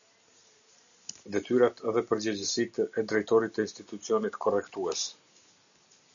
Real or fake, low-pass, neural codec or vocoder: real; 7.2 kHz; none